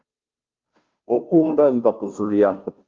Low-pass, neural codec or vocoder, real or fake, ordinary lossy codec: 7.2 kHz; codec, 16 kHz, 0.5 kbps, FunCodec, trained on Chinese and English, 25 frames a second; fake; Opus, 32 kbps